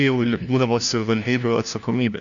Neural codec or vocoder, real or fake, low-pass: codec, 16 kHz, 1 kbps, FunCodec, trained on LibriTTS, 50 frames a second; fake; 7.2 kHz